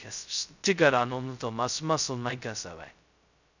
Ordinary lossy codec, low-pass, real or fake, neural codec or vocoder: none; 7.2 kHz; fake; codec, 16 kHz, 0.2 kbps, FocalCodec